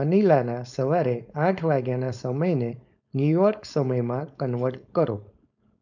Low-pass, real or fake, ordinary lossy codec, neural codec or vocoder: 7.2 kHz; fake; none; codec, 16 kHz, 4.8 kbps, FACodec